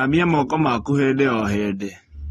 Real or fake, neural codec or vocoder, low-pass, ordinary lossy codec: real; none; 19.8 kHz; AAC, 32 kbps